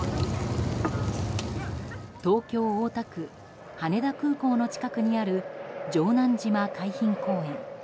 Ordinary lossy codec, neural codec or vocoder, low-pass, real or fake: none; none; none; real